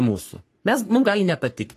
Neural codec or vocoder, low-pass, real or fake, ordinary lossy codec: codec, 44.1 kHz, 3.4 kbps, Pupu-Codec; 14.4 kHz; fake; AAC, 48 kbps